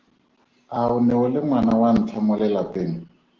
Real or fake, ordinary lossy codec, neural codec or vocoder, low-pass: real; Opus, 16 kbps; none; 7.2 kHz